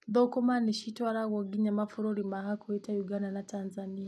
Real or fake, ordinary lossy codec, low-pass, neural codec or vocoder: real; none; none; none